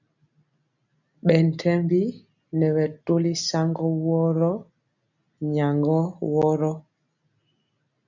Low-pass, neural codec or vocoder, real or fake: 7.2 kHz; none; real